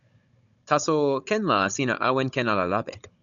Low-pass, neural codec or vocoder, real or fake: 7.2 kHz; codec, 16 kHz, 16 kbps, FunCodec, trained on Chinese and English, 50 frames a second; fake